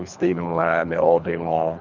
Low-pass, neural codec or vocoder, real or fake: 7.2 kHz; codec, 24 kHz, 3 kbps, HILCodec; fake